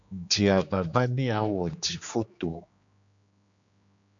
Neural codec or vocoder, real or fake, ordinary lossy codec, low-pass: codec, 16 kHz, 2 kbps, X-Codec, HuBERT features, trained on general audio; fake; AAC, 64 kbps; 7.2 kHz